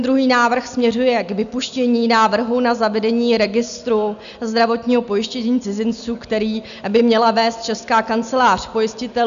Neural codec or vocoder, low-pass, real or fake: none; 7.2 kHz; real